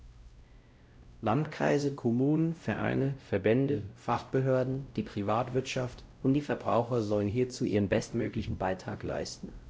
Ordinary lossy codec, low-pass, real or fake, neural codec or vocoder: none; none; fake; codec, 16 kHz, 0.5 kbps, X-Codec, WavLM features, trained on Multilingual LibriSpeech